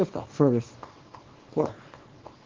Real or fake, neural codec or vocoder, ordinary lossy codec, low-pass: fake; codec, 24 kHz, 0.9 kbps, WavTokenizer, small release; Opus, 16 kbps; 7.2 kHz